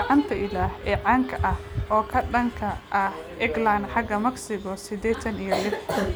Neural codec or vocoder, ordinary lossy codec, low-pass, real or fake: none; none; none; real